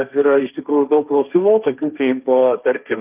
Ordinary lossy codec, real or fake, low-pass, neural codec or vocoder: Opus, 24 kbps; fake; 3.6 kHz; codec, 16 kHz, 1.1 kbps, Voila-Tokenizer